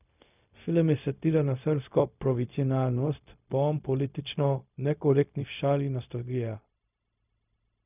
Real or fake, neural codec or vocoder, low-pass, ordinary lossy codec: fake; codec, 16 kHz, 0.4 kbps, LongCat-Audio-Codec; 3.6 kHz; none